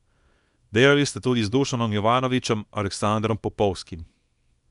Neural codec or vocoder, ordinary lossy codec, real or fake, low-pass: codec, 24 kHz, 0.9 kbps, WavTokenizer, small release; none; fake; 10.8 kHz